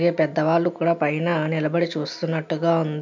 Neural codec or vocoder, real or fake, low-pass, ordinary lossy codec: none; real; 7.2 kHz; MP3, 64 kbps